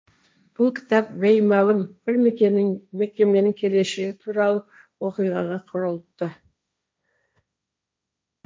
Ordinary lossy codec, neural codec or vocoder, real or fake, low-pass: none; codec, 16 kHz, 1.1 kbps, Voila-Tokenizer; fake; none